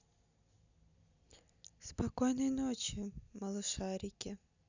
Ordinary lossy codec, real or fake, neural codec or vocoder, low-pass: none; real; none; 7.2 kHz